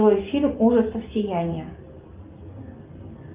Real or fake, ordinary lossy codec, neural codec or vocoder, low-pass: real; Opus, 24 kbps; none; 3.6 kHz